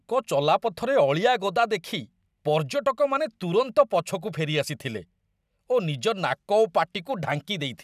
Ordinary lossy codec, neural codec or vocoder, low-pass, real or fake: none; none; 14.4 kHz; real